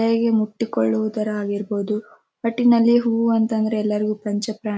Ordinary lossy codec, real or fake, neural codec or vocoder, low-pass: none; real; none; none